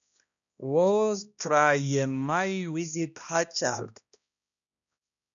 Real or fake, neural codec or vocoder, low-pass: fake; codec, 16 kHz, 1 kbps, X-Codec, HuBERT features, trained on balanced general audio; 7.2 kHz